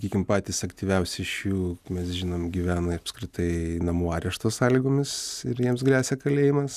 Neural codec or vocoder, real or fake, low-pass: none; real; 14.4 kHz